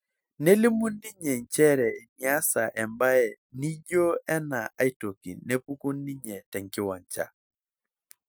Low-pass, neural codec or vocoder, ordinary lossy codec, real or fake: none; none; none; real